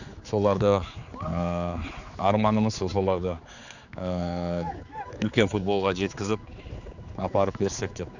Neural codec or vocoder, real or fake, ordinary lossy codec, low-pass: codec, 16 kHz, 4 kbps, X-Codec, HuBERT features, trained on general audio; fake; none; 7.2 kHz